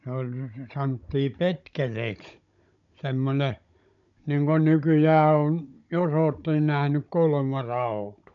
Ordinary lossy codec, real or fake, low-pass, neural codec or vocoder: none; fake; 7.2 kHz; codec, 16 kHz, 16 kbps, FunCodec, trained on Chinese and English, 50 frames a second